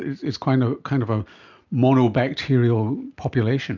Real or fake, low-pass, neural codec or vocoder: real; 7.2 kHz; none